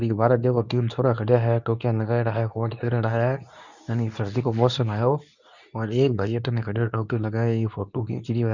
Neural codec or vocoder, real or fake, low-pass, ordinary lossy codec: codec, 24 kHz, 0.9 kbps, WavTokenizer, medium speech release version 2; fake; 7.2 kHz; none